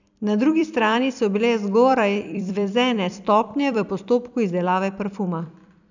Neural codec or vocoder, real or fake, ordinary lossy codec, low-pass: vocoder, 44.1 kHz, 128 mel bands every 256 samples, BigVGAN v2; fake; none; 7.2 kHz